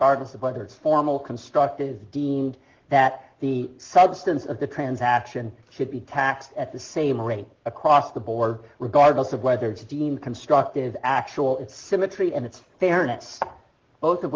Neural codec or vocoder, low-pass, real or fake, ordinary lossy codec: none; 7.2 kHz; real; Opus, 16 kbps